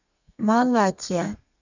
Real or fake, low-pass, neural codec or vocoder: fake; 7.2 kHz; codec, 32 kHz, 1.9 kbps, SNAC